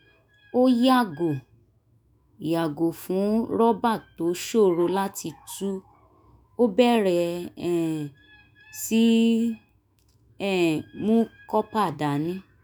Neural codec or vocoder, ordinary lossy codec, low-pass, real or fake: autoencoder, 48 kHz, 128 numbers a frame, DAC-VAE, trained on Japanese speech; none; none; fake